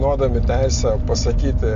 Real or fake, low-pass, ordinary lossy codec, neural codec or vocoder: real; 7.2 kHz; AAC, 64 kbps; none